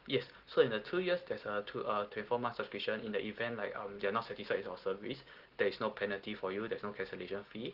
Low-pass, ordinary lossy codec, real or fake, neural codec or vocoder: 5.4 kHz; Opus, 16 kbps; real; none